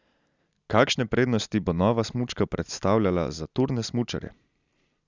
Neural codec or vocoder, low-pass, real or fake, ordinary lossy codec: none; 7.2 kHz; real; Opus, 64 kbps